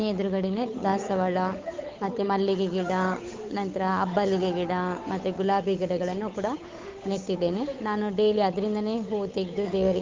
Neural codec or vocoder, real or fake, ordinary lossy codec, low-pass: codec, 16 kHz, 8 kbps, FunCodec, trained on Chinese and English, 25 frames a second; fake; Opus, 24 kbps; 7.2 kHz